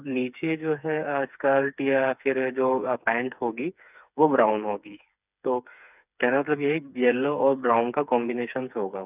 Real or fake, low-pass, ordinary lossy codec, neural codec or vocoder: fake; 3.6 kHz; none; codec, 16 kHz, 4 kbps, FreqCodec, smaller model